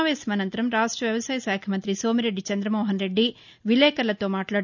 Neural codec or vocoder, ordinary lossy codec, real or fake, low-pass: none; none; real; 7.2 kHz